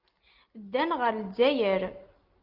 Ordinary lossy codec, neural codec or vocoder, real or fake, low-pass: Opus, 24 kbps; none; real; 5.4 kHz